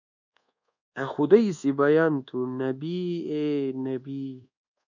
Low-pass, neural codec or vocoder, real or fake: 7.2 kHz; codec, 24 kHz, 1.2 kbps, DualCodec; fake